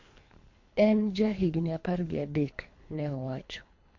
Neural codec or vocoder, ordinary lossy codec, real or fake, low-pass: codec, 24 kHz, 3 kbps, HILCodec; MP3, 48 kbps; fake; 7.2 kHz